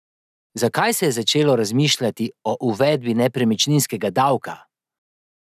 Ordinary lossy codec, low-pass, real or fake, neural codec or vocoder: none; 14.4 kHz; real; none